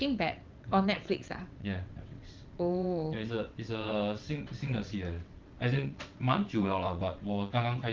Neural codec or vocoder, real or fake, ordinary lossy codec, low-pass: vocoder, 22.05 kHz, 80 mel bands, WaveNeXt; fake; Opus, 24 kbps; 7.2 kHz